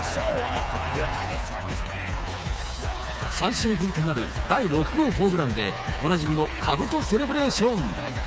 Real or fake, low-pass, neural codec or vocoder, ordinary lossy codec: fake; none; codec, 16 kHz, 4 kbps, FreqCodec, smaller model; none